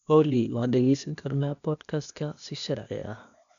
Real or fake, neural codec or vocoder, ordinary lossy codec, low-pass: fake; codec, 16 kHz, 0.8 kbps, ZipCodec; none; 7.2 kHz